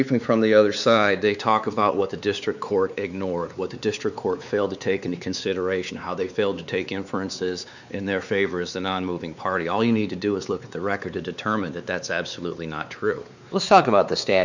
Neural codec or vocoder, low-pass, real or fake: codec, 16 kHz, 4 kbps, X-Codec, WavLM features, trained on Multilingual LibriSpeech; 7.2 kHz; fake